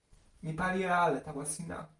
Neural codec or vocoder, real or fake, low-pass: none; real; 10.8 kHz